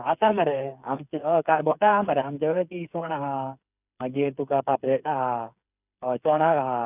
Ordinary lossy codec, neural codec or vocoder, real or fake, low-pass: none; codec, 16 kHz, 8 kbps, FreqCodec, smaller model; fake; 3.6 kHz